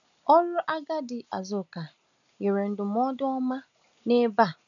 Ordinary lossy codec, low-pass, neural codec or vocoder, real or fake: none; 7.2 kHz; none; real